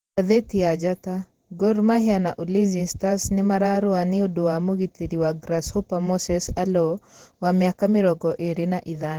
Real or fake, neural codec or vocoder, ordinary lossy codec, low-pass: fake; vocoder, 48 kHz, 128 mel bands, Vocos; Opus, 16 kbps; 19.8 kHz